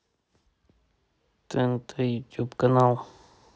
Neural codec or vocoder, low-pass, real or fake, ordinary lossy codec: none; none; real; none